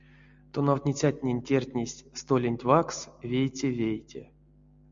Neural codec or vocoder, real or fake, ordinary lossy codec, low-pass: none; real; MP3, 64 kbps; 7.2 kHz